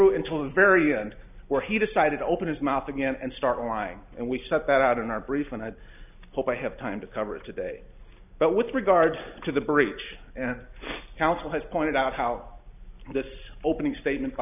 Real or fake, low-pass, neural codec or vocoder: real; 3.6 kHz; none